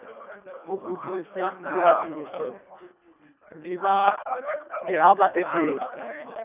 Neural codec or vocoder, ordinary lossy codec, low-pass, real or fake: codec, 24 kHz, 1.5 kbps, HILCodec; none; 3.6 kHz; fake